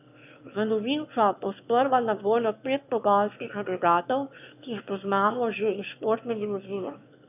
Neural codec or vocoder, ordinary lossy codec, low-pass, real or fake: autoencoder, 22.05 kHz, a latent of 192 numbers a frame, VITS, trained on one speaker; none; 3.6 kHz; fake